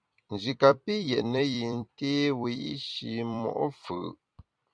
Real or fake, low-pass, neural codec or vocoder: fake; 9.9 kHz; vocoder, 44.1 kHz, 128 mel bands every 256 samples, BigVGAN v2